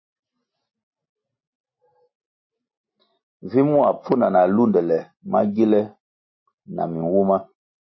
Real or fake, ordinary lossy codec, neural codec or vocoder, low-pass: real; MP3, 24 kbps; none; 7.2 kHz